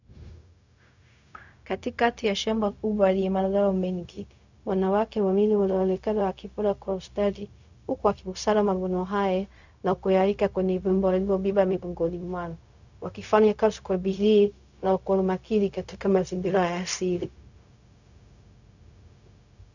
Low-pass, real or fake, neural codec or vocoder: 7.2 kHz; fake; codec, 16 kHz, 0.4 kbps, LongCat-Audio-Codec